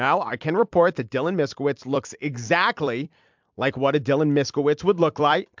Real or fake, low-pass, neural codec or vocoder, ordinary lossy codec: fake; 7.2 kHz; vocoder, 44.1 kHz, 128 mel bands every 256 samples, BigVGAN v2; MP3, 64 kbps